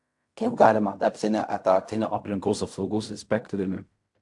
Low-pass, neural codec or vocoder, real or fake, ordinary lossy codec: 10.8 kHz; codec, 16 kHz in and 24 kHz out, 0.4 kbps, LongCat-Audio-Codec, fine tuned four codebook decoder; fake; AAC, 64 kbps